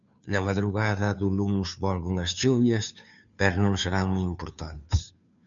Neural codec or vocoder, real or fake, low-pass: codec, 16 kHz, 2 kbps, FunCodec, trained on Chinese and English, 25 frames a second; fake; 7.2 kHz